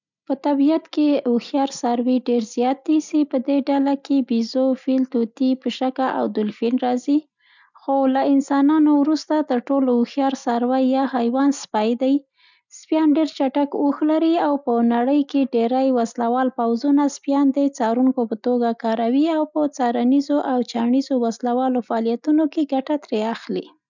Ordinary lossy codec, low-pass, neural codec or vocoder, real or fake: none; 7.2 kHz; none; real